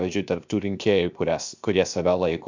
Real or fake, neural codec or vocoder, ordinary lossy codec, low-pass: fake; codec, 16 kHz, 0.7 kbps, FocalCodec; MP3, 64 kbps; 7.2 kHz